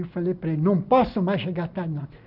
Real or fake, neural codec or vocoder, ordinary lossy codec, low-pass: real; none; none; 5.4 kHz